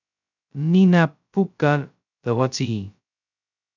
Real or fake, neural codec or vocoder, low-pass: fake; codec, 16 kHz, 0.2 kbps, FocalCodec; 7.2 kHz